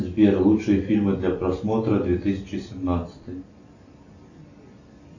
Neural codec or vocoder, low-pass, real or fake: none; 7.2 kHz; real